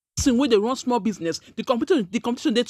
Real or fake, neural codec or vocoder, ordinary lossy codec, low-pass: real; none; none; 14.4 kHz